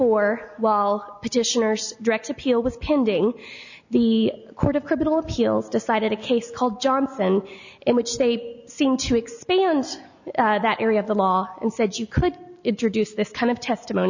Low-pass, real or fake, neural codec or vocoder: 7.2 kHz; real; none